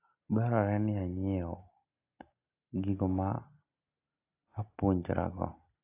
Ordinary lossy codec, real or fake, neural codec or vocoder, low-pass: AAC, 24 kbps; real; none; 3.6 kHz